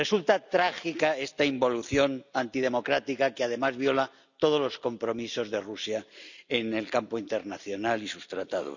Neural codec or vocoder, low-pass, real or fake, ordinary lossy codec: none; 7.2 kHz; real; none